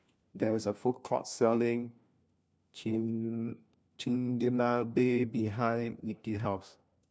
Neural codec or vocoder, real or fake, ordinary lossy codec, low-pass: codec, 16 kHz, 1 kbps, FunCodec, trained on LibriTTS, 50 frames a second; fake; none; none